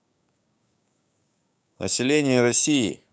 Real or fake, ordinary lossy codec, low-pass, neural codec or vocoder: fake; none; none; codec, 16 kHz, 6 kbps, DAC